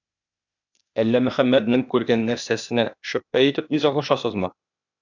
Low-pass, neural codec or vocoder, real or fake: 7.2 kHz; codec, 16 kHz, 0.8 kbps, ZipCodec; fake